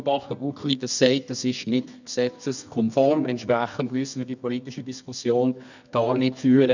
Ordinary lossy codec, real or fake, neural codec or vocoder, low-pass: none; fake; codec, 24 kHz, 0.9 kbps, WavTokenizer, medium music audio release; 7.2 kHz